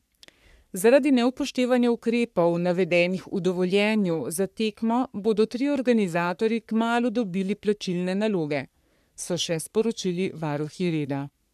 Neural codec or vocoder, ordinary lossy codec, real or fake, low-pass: codec, 44.1 kHz, 3.4 kbps, Pupu-Codec; none; fake; 14.4 kHz